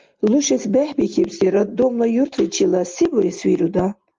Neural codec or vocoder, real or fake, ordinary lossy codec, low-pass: none; real; Opus, 32 kbps; 7.2 kHz